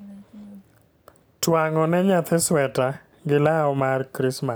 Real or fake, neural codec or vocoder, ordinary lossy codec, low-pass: real; none; none; none